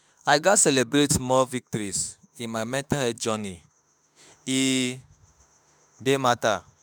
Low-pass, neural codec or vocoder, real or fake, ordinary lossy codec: none; autoencoder, 48 kHz, 32 numbers a frame, DAC-VAE, trained on Japanese speech; fake; none